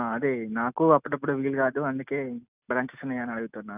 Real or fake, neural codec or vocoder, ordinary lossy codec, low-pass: real; none; none; 3.6 kHz